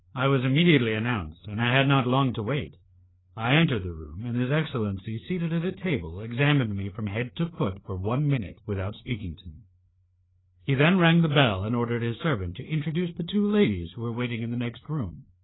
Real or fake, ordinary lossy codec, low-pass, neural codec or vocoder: fake; AAC, 16 kbps; 7.2 kHz; codec, 16 kHz, 4 kbps, FreqCodec, larger model